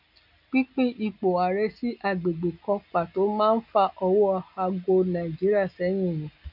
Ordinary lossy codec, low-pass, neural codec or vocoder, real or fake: none; 5.4 kHz; none; real